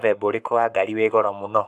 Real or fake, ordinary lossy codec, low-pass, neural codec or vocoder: fake; none; 14.4 kHz; codec, 44.1 kHz, 7.8 kbps, Pupu-Codec